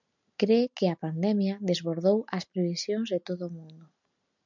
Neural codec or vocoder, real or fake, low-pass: none; real; 7.2 kHz